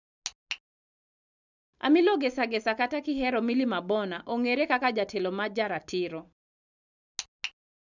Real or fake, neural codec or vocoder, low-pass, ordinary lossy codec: real; none; 7.2 kHz; none